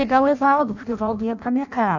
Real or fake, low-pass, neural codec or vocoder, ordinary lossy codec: fake; 7.2 kHz; codec, 16 kHz in and 24 kHz out, 0.6 kbps, FireRedTTS-2 codec; none